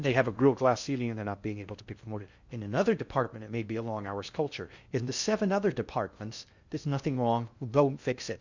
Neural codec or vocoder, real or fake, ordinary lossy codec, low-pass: codec, 16 kHz in and 24 kHz out, 0.6 kbps, FocalCodec, streaming, 2048 codes; fake; Opus, 64 kbps; 7.2 kHz